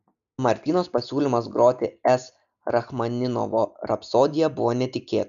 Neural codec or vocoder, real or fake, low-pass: none; real; 7.2 kHz